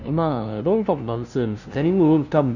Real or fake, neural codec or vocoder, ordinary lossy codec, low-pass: fake; codec, 16 kHz, 0.5 kbps, FunCodec, trained on LibriTTS, 25 frames a second; none; 7.2 kHz